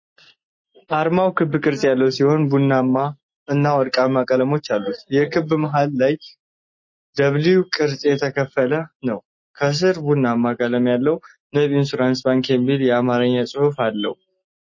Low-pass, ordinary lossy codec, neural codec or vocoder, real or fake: 7.2 kHz; MP3, 32 kbps; none; real